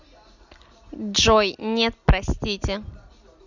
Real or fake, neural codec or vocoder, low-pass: real; none; 7.2 kHz